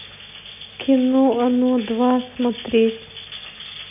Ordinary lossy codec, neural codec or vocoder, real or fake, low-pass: none; none; real; 3.6 kHz